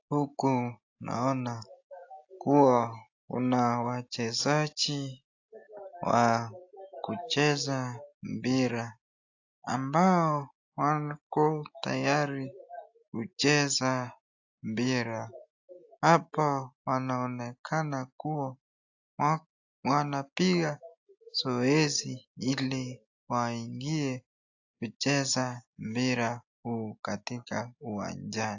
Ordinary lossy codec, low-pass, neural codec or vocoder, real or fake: AAC, 48 kbps; 7.2 kHz; none; real